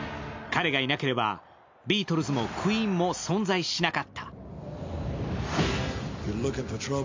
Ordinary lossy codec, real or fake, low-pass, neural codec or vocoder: MP3, 64 kbps; real; 7.2 kHz; none